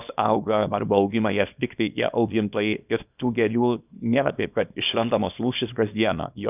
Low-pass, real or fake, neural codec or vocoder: 3.6 kHz; fake; codec, 24 kHz, 0.9 kbps, WavTokenizer, small release